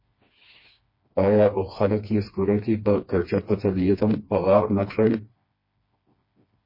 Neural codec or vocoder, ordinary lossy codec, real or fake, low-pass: codec, 16 kHz, 2 kbps, FreqCodec, smaller model; MP3, 24 kbps; fake; 5.4 kHz